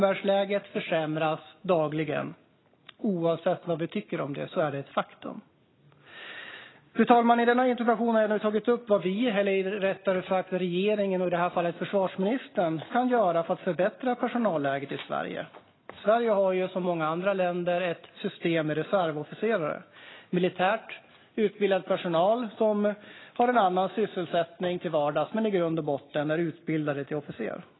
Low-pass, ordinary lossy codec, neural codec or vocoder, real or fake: 7.2 kHz; AAC, 16 kbps; none; real